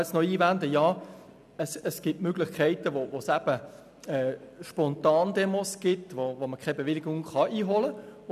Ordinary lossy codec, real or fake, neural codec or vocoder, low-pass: none; real; none; 14.4 kHz